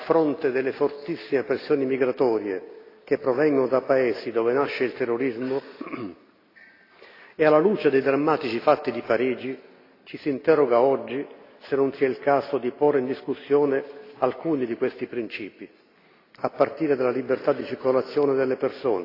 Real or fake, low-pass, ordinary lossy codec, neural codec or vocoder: real; 5.4 kHz; AAC, 32 kbps; none